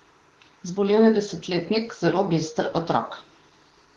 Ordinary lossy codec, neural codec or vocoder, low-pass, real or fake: Opus, 16 kbps; autoencoder, 48 kHz, 32 numbers a frame, DAC-VAE, trained on Japanese speech; 19.8 kHz; fake